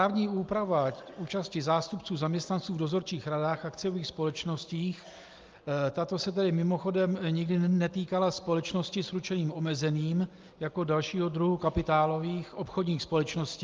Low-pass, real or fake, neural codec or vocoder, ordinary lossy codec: 7.2 kHz; real; none; Opus, 32 kbps